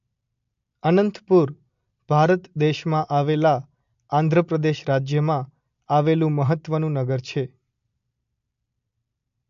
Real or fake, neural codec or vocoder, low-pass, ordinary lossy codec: real; none; 7.2 kHz; AAC, 64 kbps